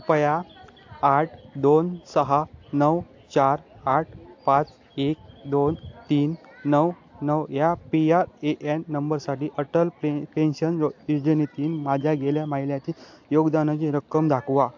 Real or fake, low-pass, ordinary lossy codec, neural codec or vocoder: real; 7.2 kHz; AAC, 48 kbps; none